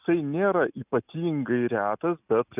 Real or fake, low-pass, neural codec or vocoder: real; 3.6 kHz; none